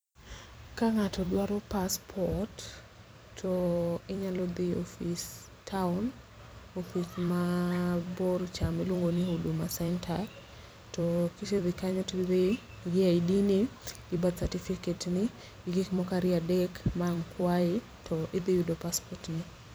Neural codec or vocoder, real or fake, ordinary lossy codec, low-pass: vocoder, 44.1 kHz, 128 mel bands every 256 samples, BigVGAN v2; fake; none; none